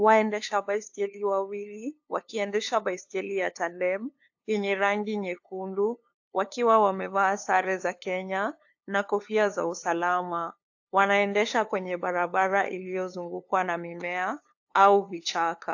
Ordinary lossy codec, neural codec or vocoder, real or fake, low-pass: AAC, 48 kbps; codec, 16 kHz, 2 kbps, FunCodec, trained on LibriTTS, 25 frames a second; fake; 7.2 kHz